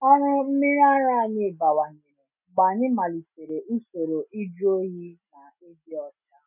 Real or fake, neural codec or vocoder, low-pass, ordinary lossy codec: real; none; 3.6 kHz; none